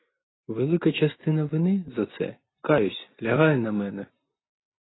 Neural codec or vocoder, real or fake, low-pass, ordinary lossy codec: none; real; 7.2 kHz; AAC, 16 kbps